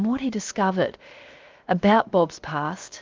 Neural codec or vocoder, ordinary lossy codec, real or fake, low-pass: codec, 16 kHz, 0.7 kbps, FocalCodec; Opus, 32 kbps; fake; 7.2 kHz